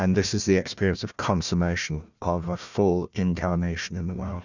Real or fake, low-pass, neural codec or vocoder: fake; 7.2 kHz; codec, 16 kHz, 1 kbps, FunCodec, trained on Chinese and English, 50 frames a second